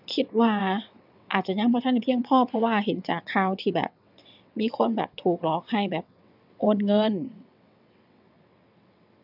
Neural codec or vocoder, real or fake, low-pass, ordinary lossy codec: vocoder, 22.05 kHz, 80 mel bands, WaveNeXt; fake; 5.4 kHz; none